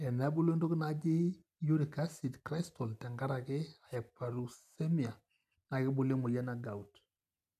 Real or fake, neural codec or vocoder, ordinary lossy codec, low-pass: real; none; none; 14.4 kHz